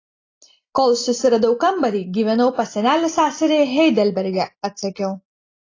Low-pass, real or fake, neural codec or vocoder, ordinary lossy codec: 7.2 kHz; real; none; AAC, 32 kbps